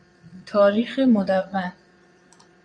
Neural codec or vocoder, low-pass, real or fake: vocoder, 22.05 kHz, 80 mel bands, Vocos; 9.9 kHz; fake